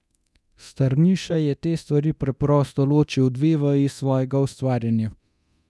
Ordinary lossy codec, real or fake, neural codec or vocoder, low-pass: none; fake; codec, 24 kHz, 0.9 kbps, DualCodec; none